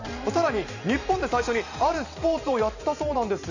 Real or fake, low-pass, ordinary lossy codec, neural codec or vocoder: fake; 7.2 kHz; none; vocoder, 44.1 kHz, 128 mel bands every 512 samples, BigVGAN v2